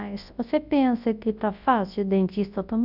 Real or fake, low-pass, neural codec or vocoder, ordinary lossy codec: fake; 5.4 kHz; codec, 24 kHz, 0.9 kbps, WavTokenizer, large speech release; none